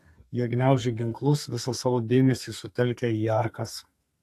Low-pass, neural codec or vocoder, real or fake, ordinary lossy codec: 14.4 kHz; codec, 32 kHz, 1.9 kbps, SNAC; fake; AAC, 64 kbps